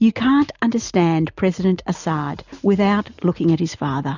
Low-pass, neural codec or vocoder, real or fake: 7.2 kHz; none; real